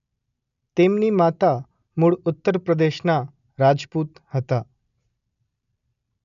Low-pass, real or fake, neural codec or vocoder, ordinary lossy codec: 7.2 kHz; real; none; none